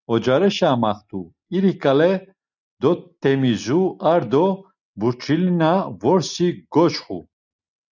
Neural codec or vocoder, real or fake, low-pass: none; real; 7.2 kHz